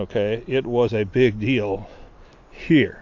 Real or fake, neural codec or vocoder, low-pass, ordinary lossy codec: real; none; 7.2 kHz; AAC, 48 kbps